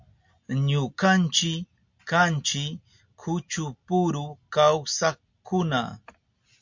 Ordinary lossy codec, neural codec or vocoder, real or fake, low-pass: MP3, 48 kbps; none; real; 7.2 kHz